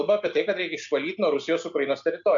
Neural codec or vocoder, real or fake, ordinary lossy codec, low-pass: none; real; AAC, 64 kbps; 7.2 kHz